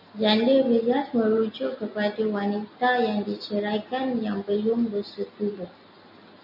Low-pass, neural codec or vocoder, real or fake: 5.4 kHz; none; real